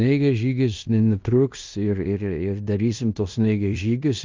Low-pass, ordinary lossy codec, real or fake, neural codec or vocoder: 7.2 kHz; Opus, 32 kbps; fake; codec, 16 kHz in and 24 kHz out, 0.9 kbps, LongCat-Audio-Codec, fine tuned four codebook decoder